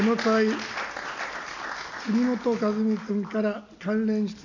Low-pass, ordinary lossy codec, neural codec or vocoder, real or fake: 7.2 kHz; none; none; real